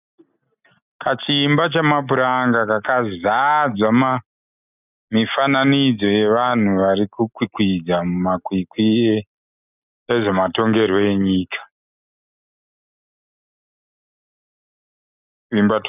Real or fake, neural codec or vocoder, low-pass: real; none; 3.6 kHz